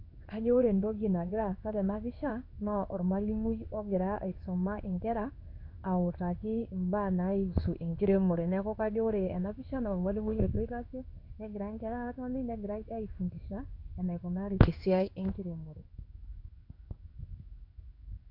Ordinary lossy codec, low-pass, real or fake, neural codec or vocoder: none; 5.4 kHz; fake; codec, 16 kHz in and 24 kHz out, 1 kbps, XY-Tokenizer